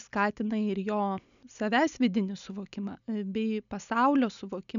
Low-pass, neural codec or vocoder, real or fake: 7.2 kHz; none; real